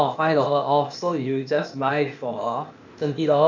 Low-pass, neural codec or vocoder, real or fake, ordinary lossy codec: 7.2 kHz; codec, 16 kHz, 0.8 kbps, ZipCodec; fake; none